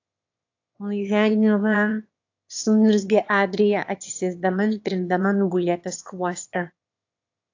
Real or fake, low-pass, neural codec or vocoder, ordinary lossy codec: fake; 7.2 kHz; autoencoder, 22.05 kHz, a latent of 192 numbers a frame, VITS, trained on one speaker; AAC, 48 kbps